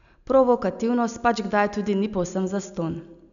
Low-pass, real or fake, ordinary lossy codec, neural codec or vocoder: 7.2 kHz; real; none; none